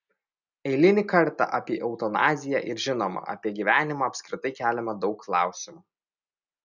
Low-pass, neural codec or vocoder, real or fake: 7.2 kHz; none; real